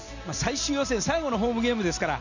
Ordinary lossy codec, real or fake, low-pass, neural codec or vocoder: none; real; 7.2 kHz; none